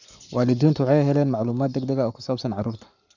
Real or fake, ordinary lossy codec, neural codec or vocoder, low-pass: fake; none; codec, 44.1 kHz, 7.8 kbps, Pupu-Codec; 7.2 kHz